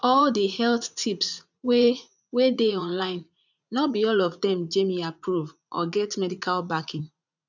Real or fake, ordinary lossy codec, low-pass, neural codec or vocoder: fake; none; 7.2 kHz; vocoder, 44.1 kHz, 80 mel bands, Vocos